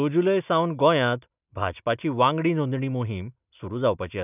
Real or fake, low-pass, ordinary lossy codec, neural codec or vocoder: real; 3.6 kHz; none; none